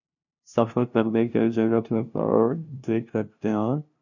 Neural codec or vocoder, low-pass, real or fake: codec, 16 kHz, 0.5 kbps, FunCodec, trained on LibriTTS, 25 frames a second; 7.2 kHz; fake